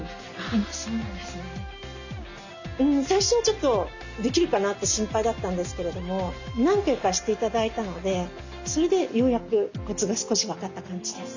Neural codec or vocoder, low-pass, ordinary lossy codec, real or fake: none; 7.2 kHz; none; real